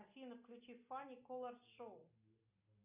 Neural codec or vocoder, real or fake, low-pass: none; real; 3.6 kHz